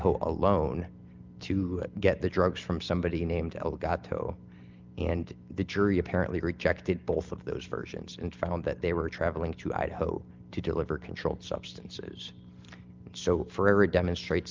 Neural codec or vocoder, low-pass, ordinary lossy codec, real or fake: none; 7.2 kHz; Opus, 24 kbps; real